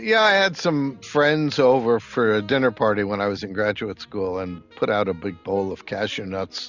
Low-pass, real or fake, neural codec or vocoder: 7.2 kHz; real; none